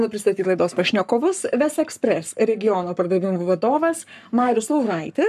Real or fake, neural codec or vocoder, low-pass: fake; codec, 44.1 kHz, 7.8 kbps, Pupu-Codec; 14.4 kHz